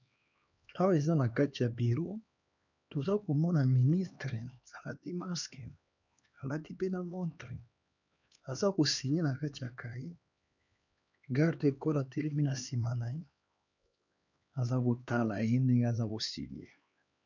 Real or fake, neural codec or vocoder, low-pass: fake; codec, 16 kHz, 2 kbps, X-Codec, HuBERT features, trained on LibriSpeech; 7.2 kHz